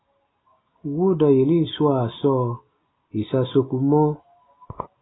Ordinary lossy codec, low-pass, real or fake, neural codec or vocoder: AAC, 16 kbps; 7.2 kHz; real; none